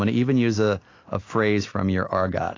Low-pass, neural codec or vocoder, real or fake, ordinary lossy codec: 7.2 kHz; none; real; AAC, 32 kbps